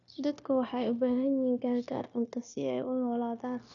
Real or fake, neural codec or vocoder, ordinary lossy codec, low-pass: fake; codec, 16 kHz, 0.9 kbps, LongCat-Audio-Codec; none; 7.2 kHz